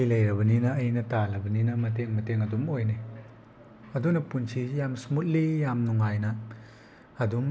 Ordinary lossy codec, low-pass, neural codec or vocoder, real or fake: none; none; none; real